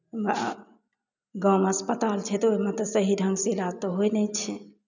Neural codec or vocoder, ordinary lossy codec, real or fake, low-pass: none; none; real; 7.2 kHz